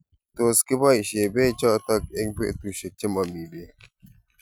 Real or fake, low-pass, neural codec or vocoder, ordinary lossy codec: real; none; none; none